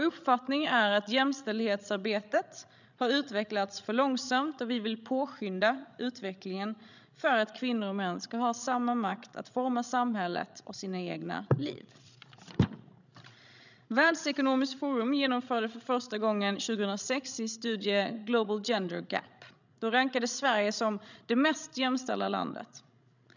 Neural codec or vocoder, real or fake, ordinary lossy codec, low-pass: codec, 16 kHz, 16 kbps, FreqCodec, larger model; fake; none; 7.2 kHz